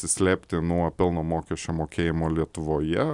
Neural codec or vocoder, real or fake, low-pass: none; real; 10.8 kHz